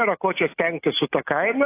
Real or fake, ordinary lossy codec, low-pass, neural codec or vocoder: real; AAC, 24 kbps; 3.6 kHz; none